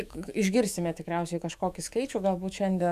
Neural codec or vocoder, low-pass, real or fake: autoencoder, 48 kHz, 128 numbers a frame, DAC-VAE, trained on Japanese speech; 14.4 kHz; fake